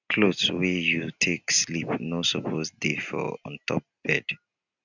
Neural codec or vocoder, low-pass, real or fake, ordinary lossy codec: none; 7.2 kHz; real; none